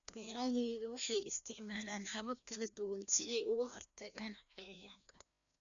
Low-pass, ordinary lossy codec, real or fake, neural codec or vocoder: 7.2 kHz; MP3, 96 kbps; fake; codec, 16 kHz, 1 kbps, FreqCodec, larger model